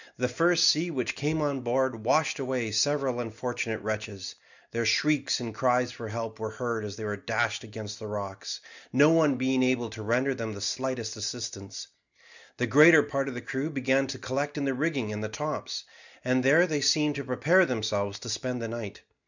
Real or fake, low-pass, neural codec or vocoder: real; 7.2 kHz; none